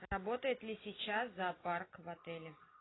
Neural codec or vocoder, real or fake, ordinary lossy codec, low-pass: none; real; AAC, 16 kbps; 7.2 kHz